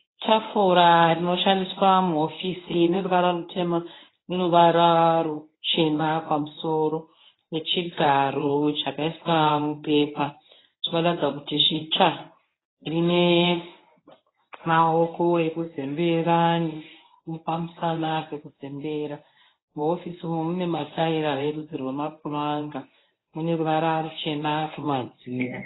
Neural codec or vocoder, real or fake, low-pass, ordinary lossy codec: codec, 24 kHz, 0.9 kbps, WavTokenizer, medium speech release version 1; fake; 7.2 kHz; AAC, 16 kbps